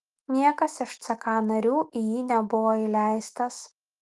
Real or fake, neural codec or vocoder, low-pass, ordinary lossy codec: fake; autoencoder, 48 kHz, 128 numbers a frame, DAC-VAE, trained on Japanese speech; 10.8 kHz; Opus, 24 kbps